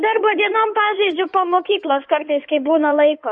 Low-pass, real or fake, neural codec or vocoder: 9.9 kHz; fake; codec, 16 kHz in and 24 kHz out, 2.2 kbps, FireRedTTS-2 codec